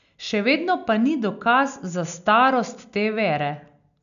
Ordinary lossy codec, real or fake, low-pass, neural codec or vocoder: none; real; 7.2 kHz; none